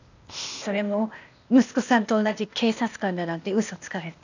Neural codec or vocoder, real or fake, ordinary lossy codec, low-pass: codec, 16 kHz, 0.8 kbps, ZipCodec; fake; none; 7.2 kHz